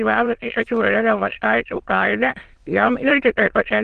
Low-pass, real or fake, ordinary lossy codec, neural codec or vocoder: 9.9 kHz; fake; Opus, 16 kbps; autoencoder, 22.05 kHz, a latent of 192 numbers a frame, VITS, trained on many speakers